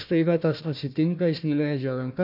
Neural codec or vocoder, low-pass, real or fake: codec, 16 kHz, 1 kbps, FunCodec, trained on Chinese and English, 50 frames a second; 5.4 kHz; fake